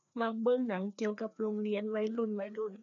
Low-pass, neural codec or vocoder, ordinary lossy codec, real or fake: 7.2 kHz; codec, 16 kHz, 2 kbps, FreqCodec, larger model; none; fake